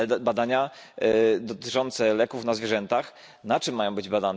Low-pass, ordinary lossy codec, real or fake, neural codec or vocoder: none; none; real; none